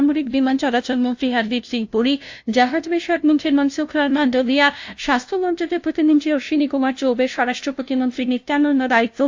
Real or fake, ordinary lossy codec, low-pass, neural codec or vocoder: fake; AAC, 48 kbps; 7.2 kHz; codec, 16 kHz, 0.5 kbps, FunCodec, trained on LibriTTS, 25 frames a second